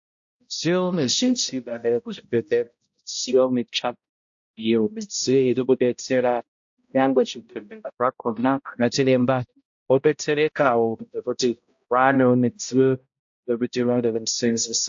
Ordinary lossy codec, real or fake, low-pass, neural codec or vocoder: AAC, 64 kbps; fake; 7.2 kHz; codec, 16 kHz, 0.5 kbps, X-Codec, HuBERT features, trained on balanced general audio